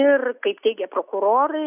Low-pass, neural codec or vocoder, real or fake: 3.6 kHz; none; real